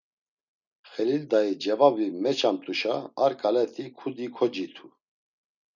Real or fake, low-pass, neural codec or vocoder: real; 7.2 kHz; none